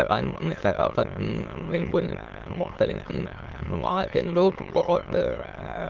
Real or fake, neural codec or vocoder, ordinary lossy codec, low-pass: fake; autoencoder, 22.05 kHz, a latent of 192 numbers a frame, VITS, trained on many speakers; Opus, 16 kbps; 7.2 kHz